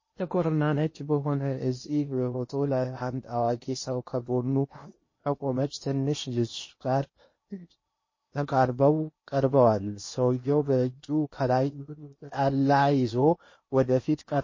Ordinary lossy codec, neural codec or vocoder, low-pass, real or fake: MP3, 32 kbps; codec, 16 kHz in and 24 kHz out, 0.8 kbps, FocalCodec, streaming, 65536 codes; 7.2 kHz; fake